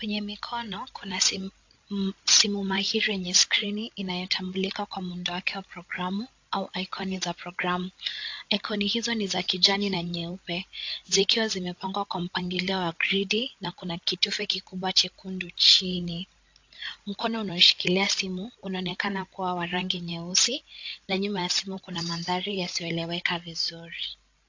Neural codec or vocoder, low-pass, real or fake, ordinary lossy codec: codec, 16 kHz, 16 kbps, FreqCodec, larger model; 7.2 kHz; fake; AAC, 48 kbps